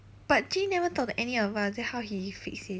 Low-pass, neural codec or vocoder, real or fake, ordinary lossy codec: none; none; real; none